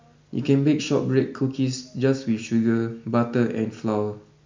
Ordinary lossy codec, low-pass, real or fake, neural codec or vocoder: MP3, 64 kbps; 7.2 kHz; real; none